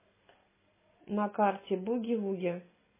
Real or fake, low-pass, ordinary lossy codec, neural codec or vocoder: real; 3.6 kHz; MP3, 16 kbps; none